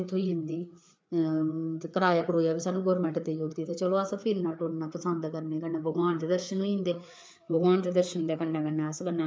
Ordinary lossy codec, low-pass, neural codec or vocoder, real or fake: none; none; codec, 16 kHz, 4 kbps, FreqCodec, larger model; fake